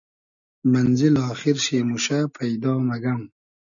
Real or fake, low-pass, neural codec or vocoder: real; 7.2 kHz; none